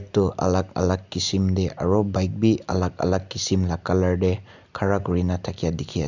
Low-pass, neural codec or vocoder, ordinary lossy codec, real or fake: 7.2 kHz; none; none; real